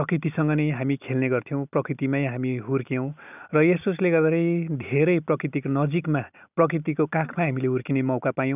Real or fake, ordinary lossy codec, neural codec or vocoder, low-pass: real; none; none; 3.6 kHz